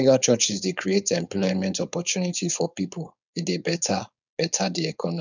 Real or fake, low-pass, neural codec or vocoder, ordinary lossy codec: fake; 7.2 kHz; codec, 16 kHz, 4.8 kbps, FACodec; none